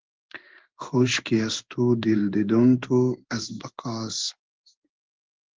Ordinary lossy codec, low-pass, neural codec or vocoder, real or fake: Opus, 16 kbps; 7.2 kHz; codec, 16 kHz in and 24 kHz out, 1 kbps, XY-Tokenizer; fake